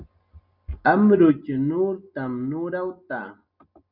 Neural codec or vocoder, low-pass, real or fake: none; 5.4 kHz; real